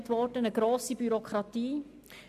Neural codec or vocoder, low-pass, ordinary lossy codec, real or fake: none; 14.4 kHz; AAC, 96 kbps; real